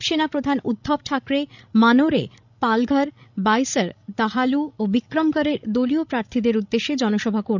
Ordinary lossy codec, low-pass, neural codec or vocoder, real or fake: none; 7.2 kHz; codec, 16 kHz, 16 kbps, FreqCodec, larger model; fake